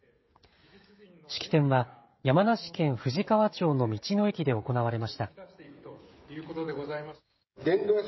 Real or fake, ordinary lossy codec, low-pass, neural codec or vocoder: fake; MP3, 24 kbps; 7.2 kHz; codec, 16 kHz, 16 kbps, FreqCodec, smaller model